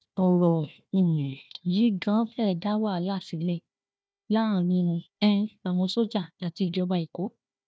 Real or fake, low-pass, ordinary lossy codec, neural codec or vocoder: fake; none; none; codec, 16 kHz, 1 kbps, FunCodec, trained on Chinese and English, 50 frames a second